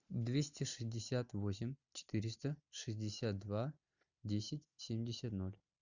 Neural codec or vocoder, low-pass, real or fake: none; 7.2 kHz; real